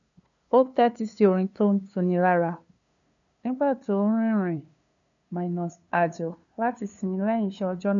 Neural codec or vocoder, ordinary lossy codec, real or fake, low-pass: codec, 16 kHz, 2 kbps, FunCodec, trained on LibriTTS, 25 frames a second; MP3, 96 kbps; fake; 7.2 kHz